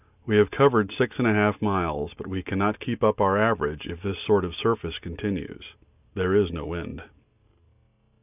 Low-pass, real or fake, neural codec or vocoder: 3.6 kHz; real; none